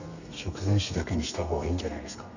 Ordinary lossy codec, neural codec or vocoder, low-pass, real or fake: none; codec, 32 kHz, 1.9 kbps, SNAC; 7.2 kHz; fake